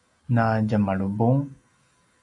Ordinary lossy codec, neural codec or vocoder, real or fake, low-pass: MP3, 96 kbps; none; real; 10.8 kHz